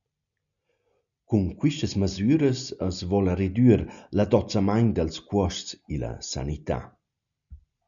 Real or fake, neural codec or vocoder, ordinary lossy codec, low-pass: real; none; MP3, 96 kbps; 7.2 kHz